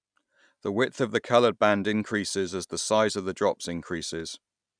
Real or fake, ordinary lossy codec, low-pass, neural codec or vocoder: real; none; 9.9 kHz; none